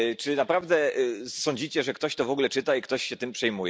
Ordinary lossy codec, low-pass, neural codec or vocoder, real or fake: none; none; none; real